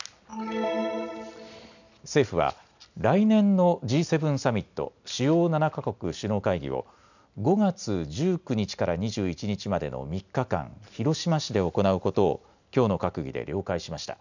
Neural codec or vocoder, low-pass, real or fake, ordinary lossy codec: none; 7.2 kHz; real; none